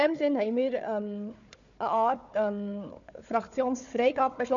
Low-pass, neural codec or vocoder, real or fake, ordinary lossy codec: 7.2 kHz; codec, 16 kHz, 4 kbps, FunCodec, trained on Chinese and English, 50 frames a second; fake; none